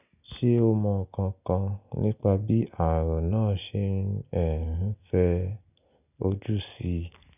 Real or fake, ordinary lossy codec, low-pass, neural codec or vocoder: real; none; 3.6 kHz; none